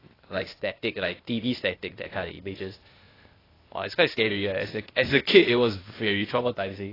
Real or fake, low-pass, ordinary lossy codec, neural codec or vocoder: fake; 5.4 kHz; AAC, 24 kbps; codec, 16 kHz, 0.8 kbps, ZipCodec